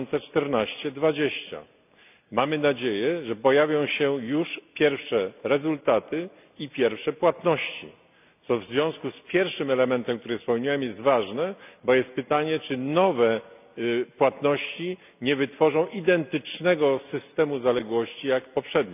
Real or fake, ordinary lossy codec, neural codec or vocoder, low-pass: real; none; none; 3.6 kHz